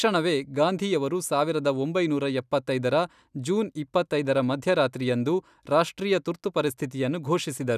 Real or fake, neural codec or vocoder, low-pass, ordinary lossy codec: real; none; 14.4 kHz; none